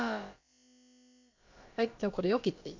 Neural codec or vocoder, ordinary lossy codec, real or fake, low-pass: codec, 16 kHz, about 1 kbps, DyCAST, with the encoder's durations; MP3, 48 kbps; fake; 7.2 kHz